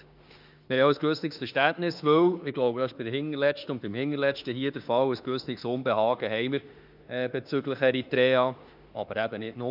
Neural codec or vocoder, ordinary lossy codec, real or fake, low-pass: autoencoder, 48 kHz, 32 numbers a frame, DAC-VAE, trained on Japanese speech; none; fake; 5.4 kHz